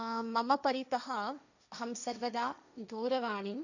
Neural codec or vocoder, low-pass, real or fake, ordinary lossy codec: codec, 16 kHz, 1.1 kbps, Voila-Tokenizer; 7.2 kHz; fake; none